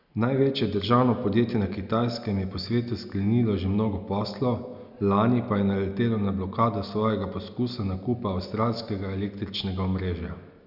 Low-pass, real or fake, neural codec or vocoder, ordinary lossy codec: 5.4 kHz; real; none; none